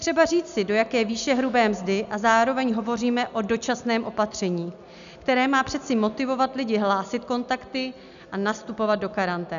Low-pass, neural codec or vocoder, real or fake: 7.2 kHz; none; real